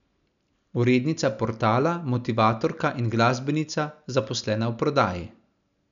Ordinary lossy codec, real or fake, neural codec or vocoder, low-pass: none; real; none; 7.2 kHz